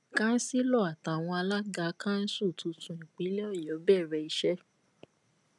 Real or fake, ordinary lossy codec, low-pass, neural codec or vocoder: real; none; 10.8 kHz; none